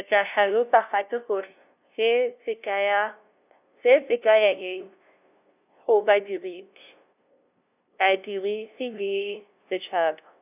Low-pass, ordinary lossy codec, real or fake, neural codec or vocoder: 3.6 kHz; none; fake; codec, 16 kHz, 0.5 kbps, FunCodec, trained on LibriTTS, 25 frames a second